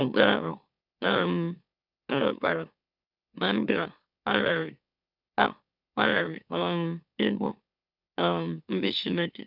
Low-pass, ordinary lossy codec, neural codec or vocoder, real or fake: 5.4 kHz; none; autoencoder, 44.1 kHz, a latent of 192 numbers a frame, MeloTTS; fake